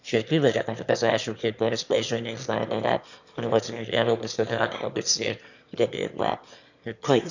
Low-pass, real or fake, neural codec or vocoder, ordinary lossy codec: 7.2 kHz; fake; autoencoder, 22.05 kHz, a latent of 192 numbers a frame, VITS, trained on one speaker; none